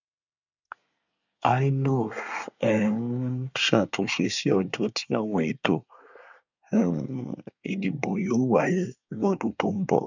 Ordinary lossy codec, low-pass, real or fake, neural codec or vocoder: none; 7.2 kHz; fake; codec, 24 kHz, 1 kbps, SNAC